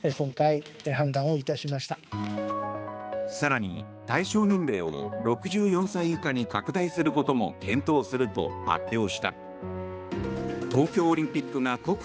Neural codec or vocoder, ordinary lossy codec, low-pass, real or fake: codec, 16 kHz, 2 kbps, X-Codec, HuBERT features, trained on balanced general audio; none; none; fake